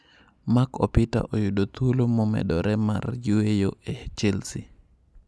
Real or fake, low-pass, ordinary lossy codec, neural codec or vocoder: real; none; none; none